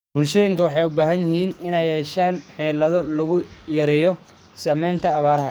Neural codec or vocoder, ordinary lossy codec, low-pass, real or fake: codec, 44.1 kHz, 2.6 kbps, SNAC; none; none; fake